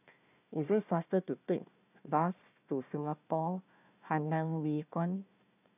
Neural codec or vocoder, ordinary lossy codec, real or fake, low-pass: codec, 16 kHz, 1 kbps, FunCodec, trained on Chinese and English, 50 frames a second; none; fake; 3.6 kHz